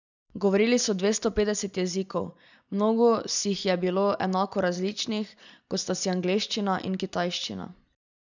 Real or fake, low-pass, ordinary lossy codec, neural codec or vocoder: real; 7.2 kHz; none; none